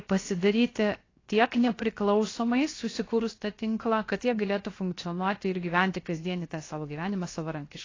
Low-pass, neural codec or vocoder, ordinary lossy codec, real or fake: 7.2 kHz; codec, 16 kHz, 0.7 kbps, FocalCodec; AAC, 32 kbps; fake